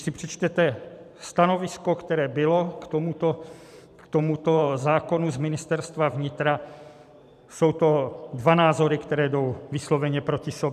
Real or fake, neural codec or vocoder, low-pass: fake; vocoder, 44.1 kHz, 128 mel bands every 512 samples, BigVGAN v2; 14.4 kHz